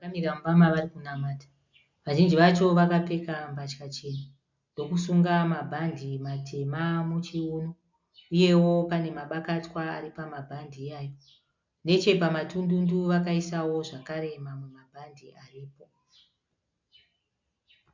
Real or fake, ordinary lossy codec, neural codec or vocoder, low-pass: real; MP3, 64 kbps; none; 7.2 kHz